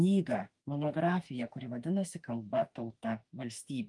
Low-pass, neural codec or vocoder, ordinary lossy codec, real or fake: 10.8 kHz; autoencoder, 48 kHz, 32 numbers a frame, DAC-VAE, trained on Japanese speech; Opus, 32 kbps; fake